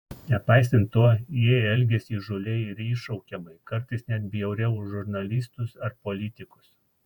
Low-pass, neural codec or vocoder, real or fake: 19.8 kHz; vocoder, 48 kHz, 128 mel bands, Vocos; fake